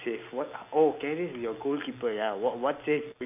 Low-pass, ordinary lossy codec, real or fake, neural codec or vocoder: 3.6 kHz; none; real; none